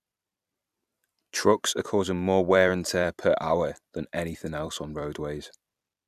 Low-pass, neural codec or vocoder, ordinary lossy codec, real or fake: 14.4 kHz; vocoder, 44.1 kHz, 128 mel bands every 256 samples, BigVGAN v2; none; fake